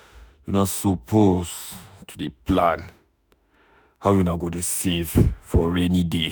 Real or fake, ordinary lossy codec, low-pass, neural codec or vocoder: fake; none; none; autoencoder, 48 kHz, 32 numbers a frame, DAC-VAE, trained on Japanese speech